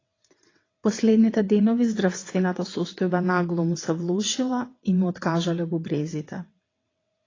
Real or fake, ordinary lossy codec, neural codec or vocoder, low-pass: fake; AAC, 32 kbps; vocoder, 22.05 kHz, 80 mel bands, WaveNeXt; 7.2 kHz